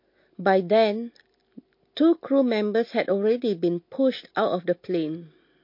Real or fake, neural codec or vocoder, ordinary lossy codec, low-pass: real; none; MP3, 32 kbps; 5.4 kHz